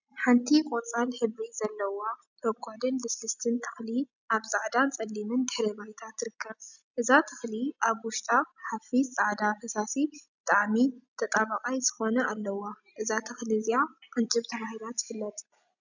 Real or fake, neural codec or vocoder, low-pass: real; none; 7.2 kHz